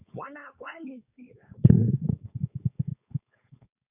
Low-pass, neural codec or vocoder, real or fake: 3.6 kHz; codec, 16 kHz, 4.8 kbps, FACodec; fake